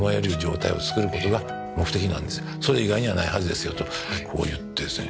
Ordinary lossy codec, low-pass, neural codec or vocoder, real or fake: none; none; none; real